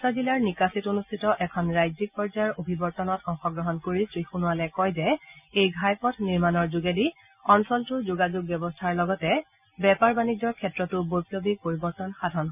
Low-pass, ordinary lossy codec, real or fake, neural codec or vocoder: 3.6 kHz; none; real; none